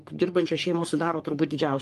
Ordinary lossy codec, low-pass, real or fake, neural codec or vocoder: Opus, 32 kbps; 14.4 kHz; fake; codec, 44.1 kHz, 3.4 kbps, Pupu-Codec